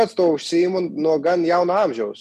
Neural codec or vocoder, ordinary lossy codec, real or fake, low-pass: none; MP3, 96 kbps; real; 14.4 kHz